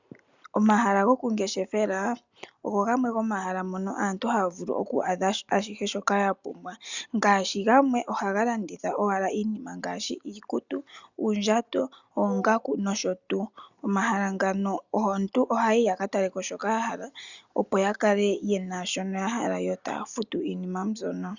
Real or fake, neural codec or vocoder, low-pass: real; none; 7.2 kHz